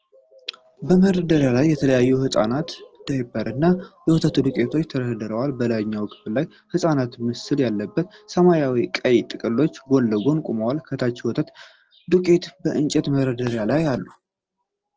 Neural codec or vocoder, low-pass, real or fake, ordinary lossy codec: none; 7.2 kHz; real; Opus, 16 kbps